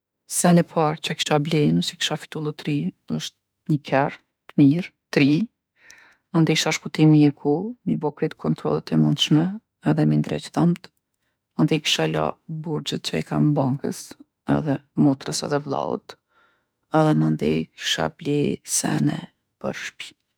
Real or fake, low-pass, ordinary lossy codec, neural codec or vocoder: fake; none; none; autoencoder, 48 kHz, 32 numbers a frame, DAC-VAE, trained on Japanese speech